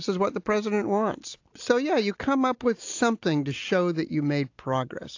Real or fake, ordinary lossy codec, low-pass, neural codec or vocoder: real; AAC, 48 kbps; 7.2 kHz; none